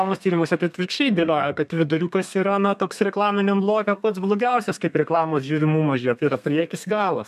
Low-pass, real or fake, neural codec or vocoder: 14.4 kHz; fake; codec, 32 kHz, 1.9 kbps, SNAC